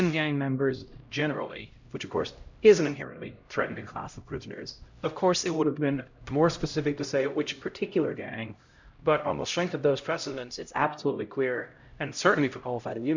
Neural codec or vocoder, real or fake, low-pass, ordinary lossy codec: codec, 16 kHz, 0.5 kbps, X-Codec, HuBERT features, trained on LibriSpeech; fake; 7.2 kHz; Opus, 64 kbps